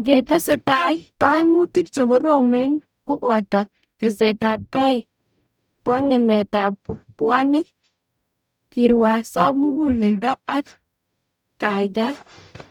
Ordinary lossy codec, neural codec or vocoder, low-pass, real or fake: none; codec, 44.1 kHz, 0.9 kbps, DAC; 19.8 kHz; fake